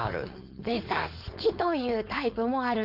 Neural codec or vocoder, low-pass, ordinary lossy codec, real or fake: codec, 16 kHz, 4.8 kbps, FACodec; 5.4 kHz; none; fake